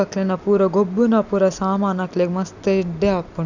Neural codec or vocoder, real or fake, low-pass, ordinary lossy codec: none; real; 7.2 kHz; none